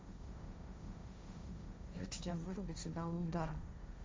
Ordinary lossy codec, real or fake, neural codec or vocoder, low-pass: none; fake; codec, 16 kHz, 1.1 kbps, Voila-Tokenizer; none